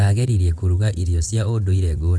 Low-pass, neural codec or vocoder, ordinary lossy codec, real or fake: none; vocoder, 22.05 kHz, 80 mel bands, WaveNeXt; none; fake